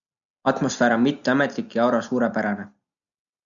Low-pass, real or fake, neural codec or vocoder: 7.2 kHz; real; none